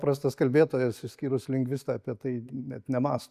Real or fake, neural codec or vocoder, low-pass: real; none; 14.4 kHz